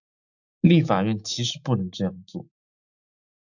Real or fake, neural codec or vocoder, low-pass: fake; autoencoder, 48 kHz, 128 numbers a frame, DAC-VAE, trained on Japanese speech; 7.2 kHz